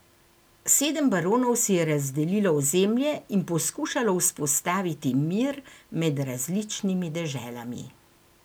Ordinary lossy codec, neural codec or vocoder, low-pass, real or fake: none; none; none; real